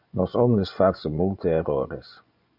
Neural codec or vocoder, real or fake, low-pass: vocoder, 24 kHz, 100 mel bands, Vocos; fake; 5.4 kHz